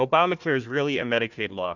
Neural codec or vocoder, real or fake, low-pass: codec, 16 kHz, 1 kbps, FunCodec, trained on Chinese and English, 50 frames a second; fake; 7.2 kHz